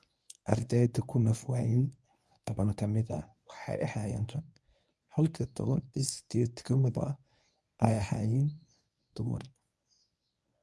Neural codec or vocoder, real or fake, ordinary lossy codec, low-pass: codec, 24 kHz, 0.9 kbps, WavTokenizer, medium speech release version 1; fake; none; none